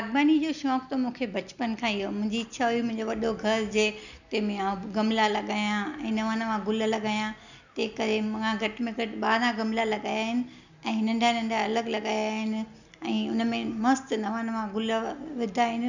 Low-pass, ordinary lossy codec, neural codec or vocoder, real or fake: 7.2 kHz; none; none; real